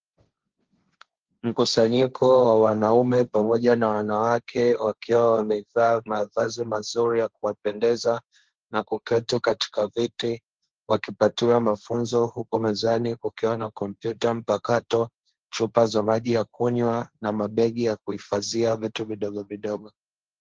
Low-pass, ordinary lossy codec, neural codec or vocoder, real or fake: 7.2 kHz; Opus, 16 kbps; codec, 16 kHz, 1.1 kbps, Voila-Tokenizer; fake